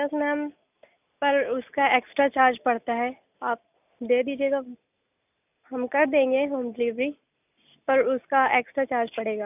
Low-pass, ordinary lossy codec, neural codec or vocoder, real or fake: 3.6 kHz; none; none; real